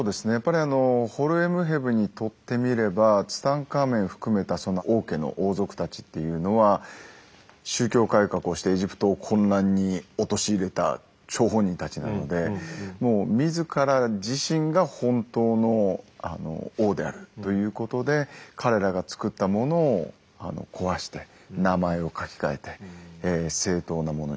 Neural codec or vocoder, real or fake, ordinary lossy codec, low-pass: none; real; none; none